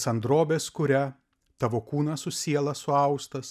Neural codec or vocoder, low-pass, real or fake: none; 14.4 kHz; real